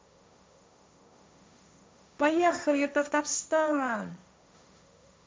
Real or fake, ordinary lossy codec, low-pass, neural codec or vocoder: fake; none; none; codec, 16 kHz, 1.1 kbps, Voila-Tokenizer